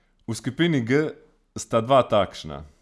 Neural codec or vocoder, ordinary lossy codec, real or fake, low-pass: none; none; real; none